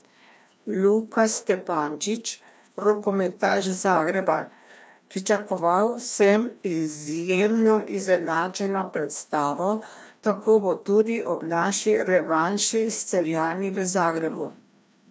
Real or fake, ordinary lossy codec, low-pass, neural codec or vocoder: fake; none; none; codec, 16 kHz, 1 kbps, FreqCodec, larger model